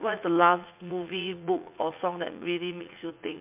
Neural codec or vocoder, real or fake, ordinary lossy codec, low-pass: vocoder, 44.1 kHz, 80 mel bands, Vocos; fake; MP3, 32 kbps; 3.6 kHz